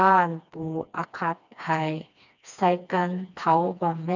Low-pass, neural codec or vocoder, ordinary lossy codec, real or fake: 7.2 kHz; codec, 16 kHz, 2 kbps, FreqCodec, smaller model; none; fake